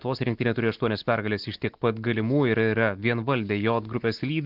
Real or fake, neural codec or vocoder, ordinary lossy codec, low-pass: real; none; Opus, 16 kbps; 5.4 kHz